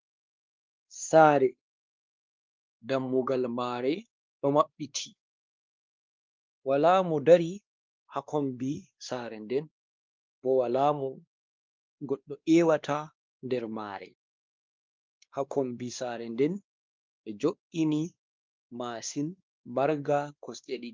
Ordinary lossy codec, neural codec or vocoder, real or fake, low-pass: Opus, 32 kbps; codec, 16 kHz, 2 kbps, X-Codec, WavLM features, trained on Multilingual LibriSpeech; fake; 7.2 kHz